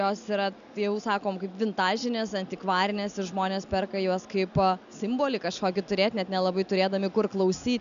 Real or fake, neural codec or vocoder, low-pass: real; none; 7.2 kHz